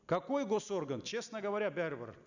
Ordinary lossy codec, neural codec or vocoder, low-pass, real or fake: none; none; 7.2 kHz; real